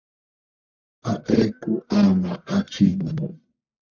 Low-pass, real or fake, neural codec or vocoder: 7.2 kHz; fake; codec, 44.1 kHz, 1.7 kbps, Pupu-Codec